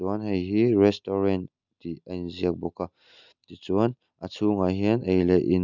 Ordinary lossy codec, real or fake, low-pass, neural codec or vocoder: none; real; 7.2 kHz; none